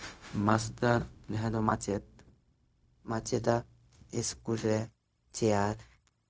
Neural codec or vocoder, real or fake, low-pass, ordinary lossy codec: codec, 16 kHz, 0.4 kbps, LongCat-Audio-Codec; fake; none; none